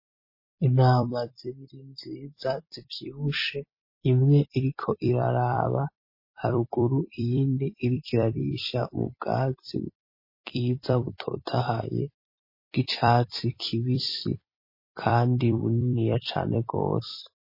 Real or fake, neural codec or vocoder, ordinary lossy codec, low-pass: fake; vocoder, 44.1 kHz, 128 mel bands every 256 samples, BigVGAN v2; MP3, 24 kbps; 5.4 kHz